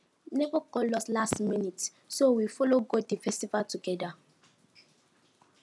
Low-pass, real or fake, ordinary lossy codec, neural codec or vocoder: none; real; none; none